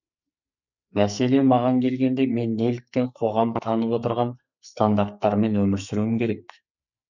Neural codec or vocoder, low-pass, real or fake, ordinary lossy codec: codec, 44.1 kHz, 2.6 kbps, SNAC; 7.2 kHz; fake; none